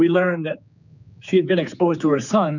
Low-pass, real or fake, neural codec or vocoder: 7.2 kHz; fake; codec, 16 kHz, 4 kbps, X-Codec, HuBERT features, trained on general audio